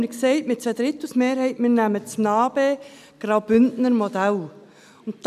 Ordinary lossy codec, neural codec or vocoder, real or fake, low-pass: none; none; real; 14.4 kHz